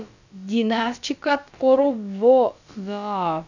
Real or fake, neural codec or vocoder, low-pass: fake; codec, 16 kHz, about 1 kbps, DyCAST, with the encoder's durations; 7.2 kHz